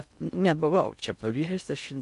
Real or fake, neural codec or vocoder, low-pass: fake; codec, 16 kHz in and 24 kHz out, 0.6 kbps, FocalCodec, streaming, 2048 codes; 10.8 kHz